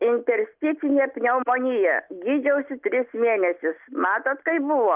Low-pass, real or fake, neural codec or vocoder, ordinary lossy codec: 3.6 kHz; real; none; Opus, 24 kbps